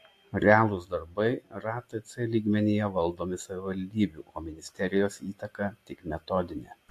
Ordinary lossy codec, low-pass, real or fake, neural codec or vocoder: AAC, 64 kbps; 14.4 kHz; real; none